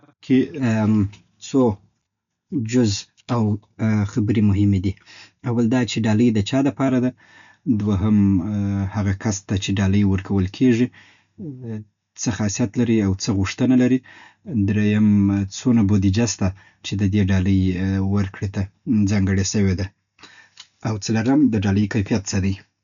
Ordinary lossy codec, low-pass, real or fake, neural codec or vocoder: none; 7.2 kHz; real; none